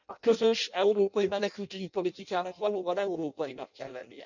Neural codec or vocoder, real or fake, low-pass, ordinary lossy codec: codec, 16 kHz in and 24 kHz out, 0.6 kbps, FireRedTTS-2 codec; fake; 7.2 kHz; none